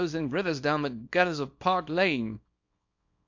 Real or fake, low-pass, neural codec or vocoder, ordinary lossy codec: fake; 7.2 kHz; codec, 24 kHz, 0.9 kbps, WavTokenizer, small release; MP3, 48 kbps